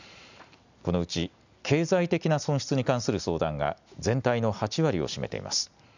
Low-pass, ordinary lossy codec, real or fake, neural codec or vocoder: 7.2 kHz; none; real; none